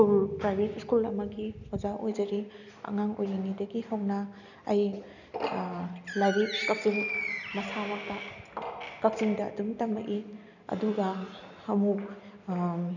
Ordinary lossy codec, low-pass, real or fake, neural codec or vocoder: none; 7.2 kHz; real; none